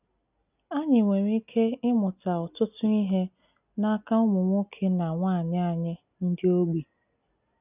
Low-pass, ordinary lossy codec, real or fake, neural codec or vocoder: 3.6 kHz; none; real; none